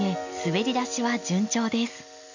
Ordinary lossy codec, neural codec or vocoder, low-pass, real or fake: none; none; 7.2 kHz; real